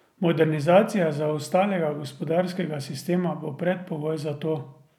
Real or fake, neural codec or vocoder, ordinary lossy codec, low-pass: real; none; none; 19.8 kHz